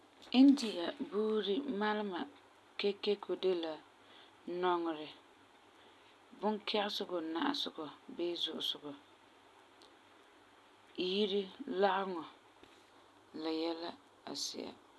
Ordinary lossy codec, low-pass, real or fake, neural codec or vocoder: none; none; real; none